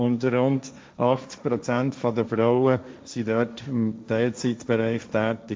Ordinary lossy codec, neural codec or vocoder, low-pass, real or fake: none; codec, 16 kHz, 1.1 kbps, Voila-Tokenizer; none; fake